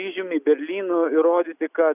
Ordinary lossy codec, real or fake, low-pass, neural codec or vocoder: AAC, 32 kbps; real; 3.6 kHz; none